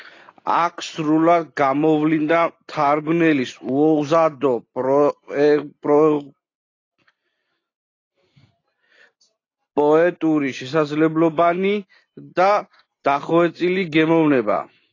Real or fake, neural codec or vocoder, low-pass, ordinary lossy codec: real; none; 7.2 kHz; AAC, 32 kbps